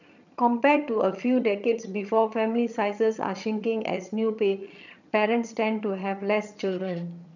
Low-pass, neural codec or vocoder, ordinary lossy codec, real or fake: 7.2 kHz; vocoder, 22.05 kHz, 80 mel bands, HiFi-GAN; none; fake